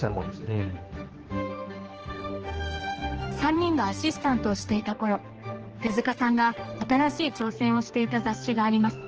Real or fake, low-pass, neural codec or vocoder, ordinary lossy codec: fake; 7.2 kHz; codec, 16 kHz, 2 kbps, X-Codec, HuBERT features, trained on general audio; Opus, 16 kbps